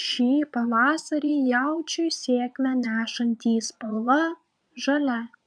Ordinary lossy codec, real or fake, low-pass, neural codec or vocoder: MP3, 96 kbps; fake; 9.9 kHz; vocoder, 22.05 kHz, 80 mel bands, Vocos